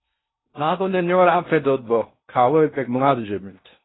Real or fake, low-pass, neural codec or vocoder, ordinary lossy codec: fake; 7.2 kHz; codec, 16 kHz in and 24 kHz out, 0.6 kbps, FocalCodec, streaming, 4096 codes; AAC, 16 kbps